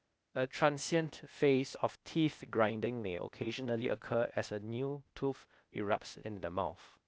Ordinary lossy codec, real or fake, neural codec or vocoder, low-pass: none; fake; codec, 16 kHz, 0.8 kbps, ZipCodec; none